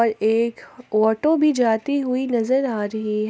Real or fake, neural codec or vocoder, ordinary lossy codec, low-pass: real; none; none; none